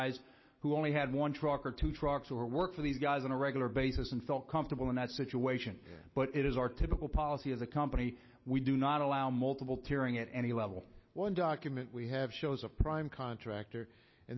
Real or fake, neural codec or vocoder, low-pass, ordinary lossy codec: real; none; 7.2 kHz; MP3, 24 kbps